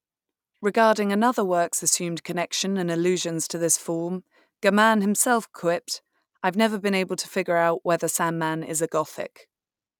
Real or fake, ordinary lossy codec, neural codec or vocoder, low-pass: real; none; none; 19.8 kHz